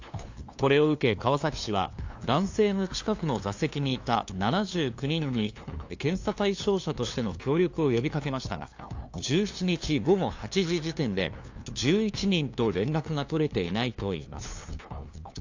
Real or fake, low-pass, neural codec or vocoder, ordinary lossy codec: fake; 7.2 kHz; codec, 16 kHz, 2 kbps, FunCodec, trained on LibriTTS, 25 frames a second; AAC, 48 kbps